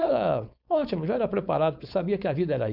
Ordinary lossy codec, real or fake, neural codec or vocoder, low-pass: none; fake; codec, 16 kHz, 4.8 kbps, FACodec; 5.4 kHz